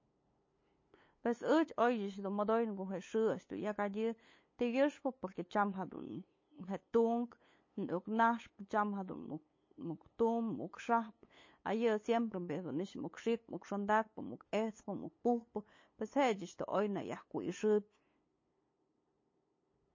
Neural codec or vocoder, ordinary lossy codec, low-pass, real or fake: codec, 16 kHz, 8 kbps, FunCodec, trained on LibriTTS, 25 frames a second; MP3, 32 kbps; 7.2 kHz; fake